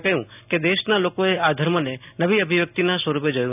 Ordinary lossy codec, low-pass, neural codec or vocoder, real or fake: none; 3.6 kHz; none; real